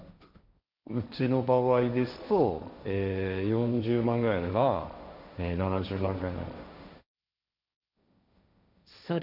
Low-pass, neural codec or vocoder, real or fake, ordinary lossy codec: 5.4 kHz; codec, 16 kHz, 1.1 kbps, Voila-Tokenizer; fake; none